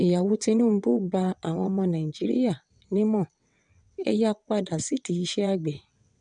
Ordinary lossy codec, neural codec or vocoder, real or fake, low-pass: none; vocoder, 22.05 kHz, 80 mel bands, WaveNeXt; fake; 9.9 kHz